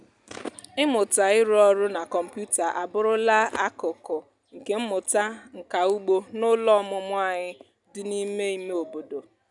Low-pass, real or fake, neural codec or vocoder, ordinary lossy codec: 10.8 kHz; real; none; none